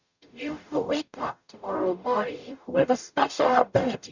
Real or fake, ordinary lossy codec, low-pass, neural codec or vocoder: fake; none; 7.2 kHz; codec, 44.1 kHz, 0.9 kbps, DAC